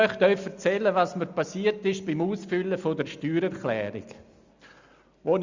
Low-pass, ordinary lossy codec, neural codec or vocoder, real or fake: 7.2 kHz; Opus, 64 kbps; none; real